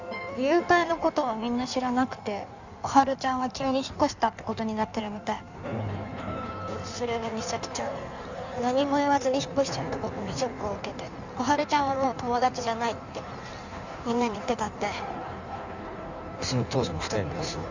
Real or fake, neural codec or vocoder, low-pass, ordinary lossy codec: fake; codec, 16 kHz in and 24 kHz out, 1.1 kbps, FireRedTTS-2 codec; 7.2 kHz; none